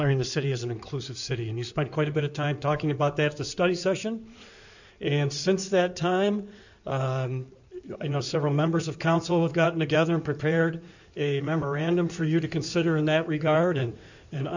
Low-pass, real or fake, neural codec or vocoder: 7.2 kHz; fake; codec, 16 kHz in and 24 kHz out, 2.2 kbps, FireRedTTS-2 codec